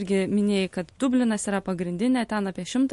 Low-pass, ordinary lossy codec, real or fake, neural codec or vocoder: 14.4 kHz; MP3, 48 kbps; real; none